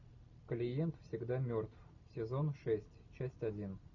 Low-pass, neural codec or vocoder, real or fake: 7.2 kHz; none; real